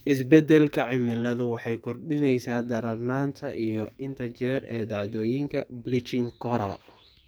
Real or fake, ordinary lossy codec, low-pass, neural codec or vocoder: fake; none; none; codec, 44.1 kHz, 2.6 kbps, SNAC